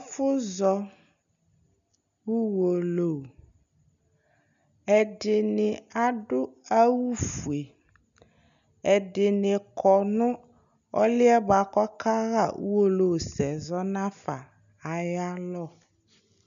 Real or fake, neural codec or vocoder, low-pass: real; none; 7.2 kHz